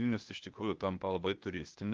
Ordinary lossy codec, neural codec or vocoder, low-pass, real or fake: Opus, 32 kbps; codec, 16 kHz, 0.8 kbps, ZipCodec; 7.2 kHz; fake